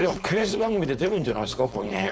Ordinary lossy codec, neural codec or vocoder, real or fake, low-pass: none; codec, 16 kHz, 4.8 kbps, FACodec; fake; none